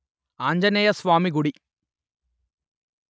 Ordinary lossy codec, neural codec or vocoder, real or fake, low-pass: none; none; real; none